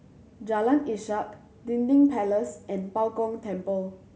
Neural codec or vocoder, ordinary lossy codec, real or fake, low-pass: none; none; real; none